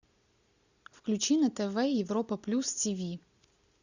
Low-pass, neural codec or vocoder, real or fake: 7.2 kHz; none; real